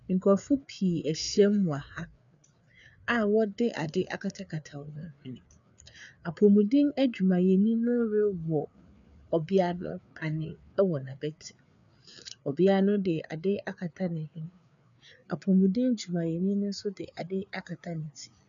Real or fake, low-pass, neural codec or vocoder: fake; 7.2 kHz; codec, 16 kHz, 4 kbps, FreqCodec, larger model